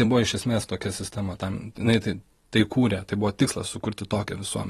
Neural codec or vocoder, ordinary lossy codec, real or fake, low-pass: vocoder, 44.1 kHz, 128 mel bands every 256 samples, BigVGAN v2; AAC, 32 kbps; fake; 19.8 kHz